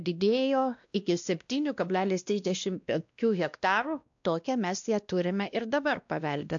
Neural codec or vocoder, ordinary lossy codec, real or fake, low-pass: codec, 16 kHz, 1 kbps, X-Codec, WavLM features, trained on Multilingual LibriSpeech; AAC, 64 kbps; fake; 7.2 kHz